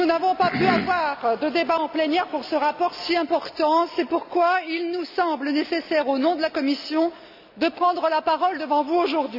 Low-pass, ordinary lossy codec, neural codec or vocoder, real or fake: 5.4 kHz; none; none; real